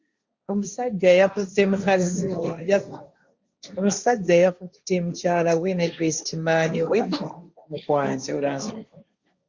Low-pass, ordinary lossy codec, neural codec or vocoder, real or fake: 7.2 kHz; Opus, 64 kbps; codec, 16 kHz, 1.1 kbps, Voila-Tokenizer; fake